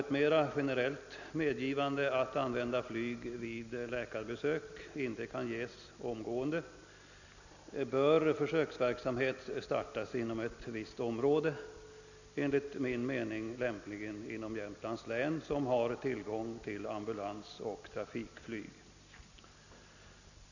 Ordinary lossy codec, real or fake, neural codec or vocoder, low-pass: none; real; none; 7.2 kHz